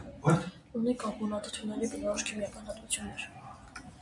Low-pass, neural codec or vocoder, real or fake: 10.8 kHz; none; real